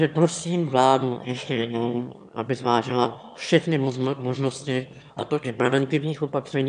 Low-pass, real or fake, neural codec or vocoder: 9.9 kHz; fake; autoencoder, 22.05 kHz, a latent of 192 numbers a frame, VITS, trained on one speaker